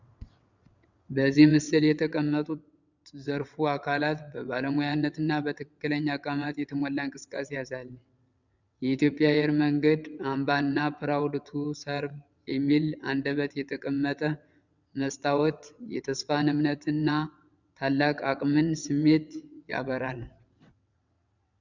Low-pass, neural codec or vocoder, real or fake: 7.2 kHz; vocoder, 22.05 kHz, 80 mel bands, WaveNeXt; fake